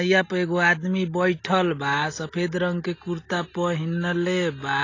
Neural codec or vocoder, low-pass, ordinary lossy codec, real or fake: none; 7.2 kHz; AAC, 32 kbps; real